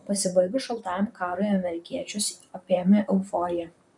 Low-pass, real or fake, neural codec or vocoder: 10.8 kHz; fake; vocoder, 44.1 kHz, 128 mel bands, Pupu-Vocoder